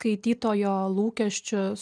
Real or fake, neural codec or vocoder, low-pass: real; none; 9.9 kHz